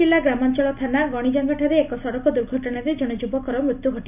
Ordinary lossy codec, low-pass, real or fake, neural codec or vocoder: none; 3.6 kHz; real; none